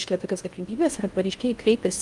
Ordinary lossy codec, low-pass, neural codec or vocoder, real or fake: Opus, 32 kbps; 10.8 kHz; codec, 16 kHz in and 24 kHz out, 0.6 kbps, FocalCodec, streaming, 4096 codes; fake